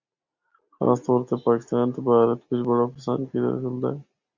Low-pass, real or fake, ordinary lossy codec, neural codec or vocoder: 7.2 kHz; real; Opus, 64 kbps; none